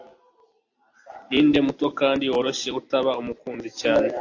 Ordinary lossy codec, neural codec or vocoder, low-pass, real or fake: AAC, 48 kbps; none; 7.2 kHz; real